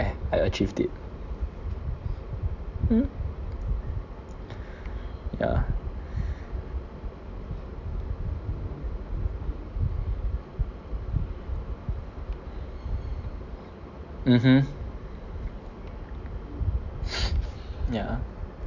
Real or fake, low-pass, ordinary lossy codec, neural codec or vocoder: real; 7.2 kHz; none; none